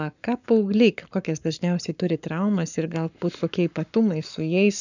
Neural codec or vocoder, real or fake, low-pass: codec, 44.1 kHz, 7.8 kbps, Pupu-Codec; fake; 7.2 kHz